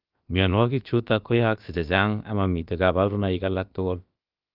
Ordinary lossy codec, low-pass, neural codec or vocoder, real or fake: Opus, 24 kbps; 5.4 kHz; codec, 16 kHz, about 1 kbps, DyCAST, with the encoder's durations; fake